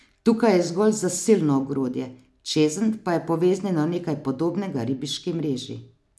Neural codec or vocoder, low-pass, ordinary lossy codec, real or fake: none; none; none; real